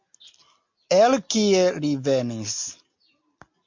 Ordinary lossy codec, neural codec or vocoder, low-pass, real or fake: MP3, 48 kbps; none; 7.2 kHz; real